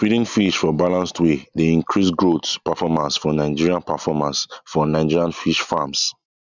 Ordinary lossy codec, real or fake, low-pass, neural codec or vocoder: none; real; 7.2 kHz; none